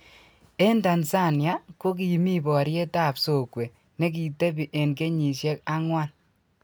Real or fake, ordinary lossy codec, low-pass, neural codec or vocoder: real; none; none; none